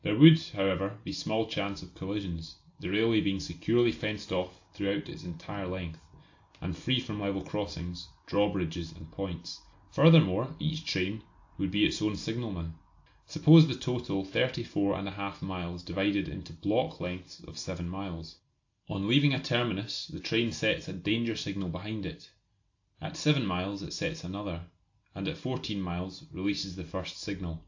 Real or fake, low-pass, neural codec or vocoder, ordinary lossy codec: real; 7.2 kHz; none; AAC, 48 kbps